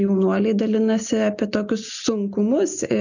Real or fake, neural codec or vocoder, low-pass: real; none; 7.2 kHz